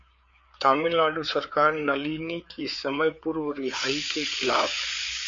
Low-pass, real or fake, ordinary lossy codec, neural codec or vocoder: 7.2 kHz; fake; MP3, 48 kbps; codec, 16 kHz, 4 kbps, FreqCodec, larger model